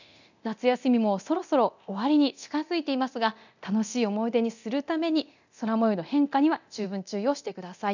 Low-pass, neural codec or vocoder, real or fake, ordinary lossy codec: 7.2 kHz; codec, 24 kHz, 0.9 kbps, DualCodec; fake; none